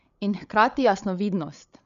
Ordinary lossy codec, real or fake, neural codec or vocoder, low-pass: none; fake; codec, 16 kHz, 8 kbps, FreqCodec, larger model; 7.2 kHz